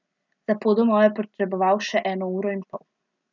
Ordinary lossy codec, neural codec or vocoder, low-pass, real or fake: none; none; 7.2 kHz; real